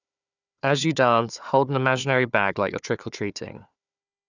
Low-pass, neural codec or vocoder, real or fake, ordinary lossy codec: 7.2 kHz; codec, 16 kHz, 4 kbps, FunCodec, trained on Chinese and English, 50 frames a second; fake; none